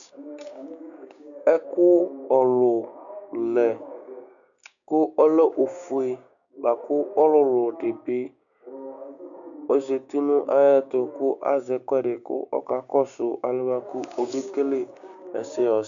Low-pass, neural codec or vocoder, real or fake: 7.2 kHz; codec, 16 kHz, 6 kbps, DAC; fake